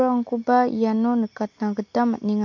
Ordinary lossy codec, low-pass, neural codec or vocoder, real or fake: MP3, 48 kbps; 7.2 kHz; none; real